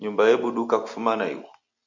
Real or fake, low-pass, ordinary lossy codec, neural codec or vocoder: real; 7.2 kHz; AAC, 48 kbps; none